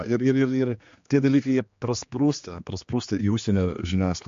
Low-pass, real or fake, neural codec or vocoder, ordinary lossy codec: 7.2 kHz; fake; codec, 16 kHz, 2 kbps, X-Codec, HuBERT features, trained on general audio; MP3, 48 kbps